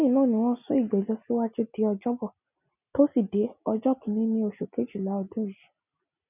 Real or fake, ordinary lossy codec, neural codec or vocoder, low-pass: real; none; none; 3.6 kHz